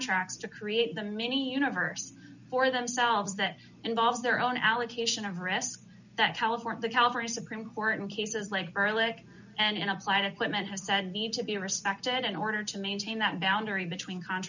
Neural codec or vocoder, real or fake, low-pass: none; real; 7.2 kHz